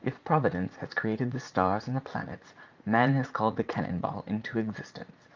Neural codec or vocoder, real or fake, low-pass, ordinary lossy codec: vocoder, 44.1 kHz, 80 mel bands, Vocos; fake; 7.2 kHz; Opus, 24 kbps